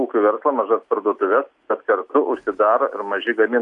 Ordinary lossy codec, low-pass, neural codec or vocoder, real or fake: AAC, 64 kbps; 10.8 kHz; none; real